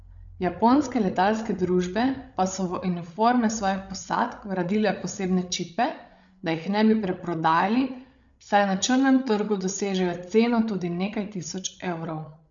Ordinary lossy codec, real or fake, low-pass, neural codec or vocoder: none; fake; 7.2 kHz; codec, 16 kHz, 8 kbps, FreqCodec, larger model